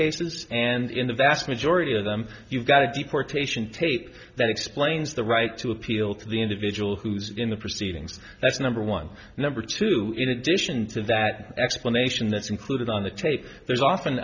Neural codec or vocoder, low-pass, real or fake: none; 7.2 kHz; real